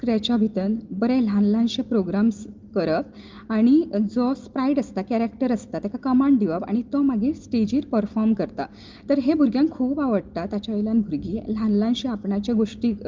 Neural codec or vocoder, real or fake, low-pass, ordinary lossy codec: none; real; 7.2 kHz; Opus, 32 kbps